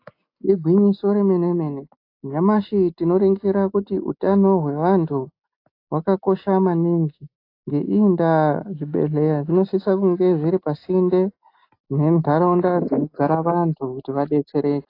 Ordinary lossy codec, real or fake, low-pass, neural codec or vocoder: AAC, 32 kbps; real; 5.4 kHz; none